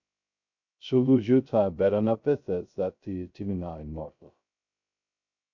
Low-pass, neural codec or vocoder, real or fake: 7.2 kHz; codec, 16 kHz, 0.2 kbps, FocalCodec; fake